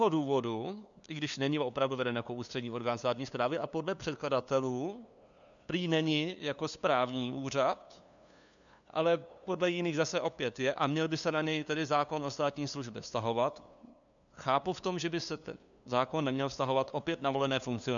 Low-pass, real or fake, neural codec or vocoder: 7.2 kHz; fake; codec, 16 kHz, 2 kbps, FunCodec, trained on LibriTTS, 25 frames a second